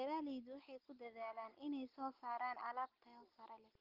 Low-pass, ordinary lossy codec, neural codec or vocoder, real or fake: 5.4 kHz; Opus, 24 kbps; none; real